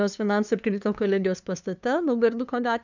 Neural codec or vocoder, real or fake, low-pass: codec, 16 kHz, 2 kbps, FunCodec, trained on LibriTTS, 25 frames a second; fake; 7.2 kHz